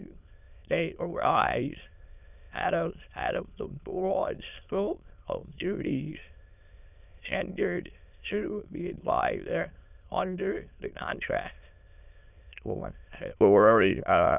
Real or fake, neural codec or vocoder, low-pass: fake; autoencoder, 22.05 kHz, a latent of 192 numbers a frame, VITS, trained on many speakers; 3.6 kHz